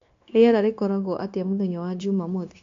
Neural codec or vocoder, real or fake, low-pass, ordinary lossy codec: codec, 16 kHz, 0.9 kbps, LongCat-Audio-Codec; fake; 7.2 kHz; AAC, 48 kbps